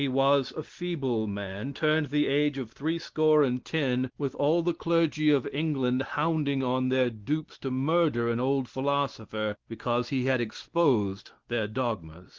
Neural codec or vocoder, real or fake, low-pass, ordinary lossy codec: none; real; 7.2 kHz; Opus, 16 kbps